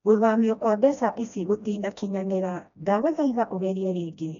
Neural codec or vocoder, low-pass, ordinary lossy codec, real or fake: codec, 16 kHz, 1 kbps, FreqCodec, smaller model; 7.2 kHz; MP3, 96 kbps; fake